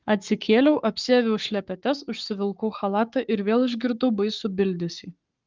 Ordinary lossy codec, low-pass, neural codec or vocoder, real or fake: Opus, 32 kbps; 7.2 kHz; codec, 44.1 kHz, 7.8 kbps, Pupu-Codec; fake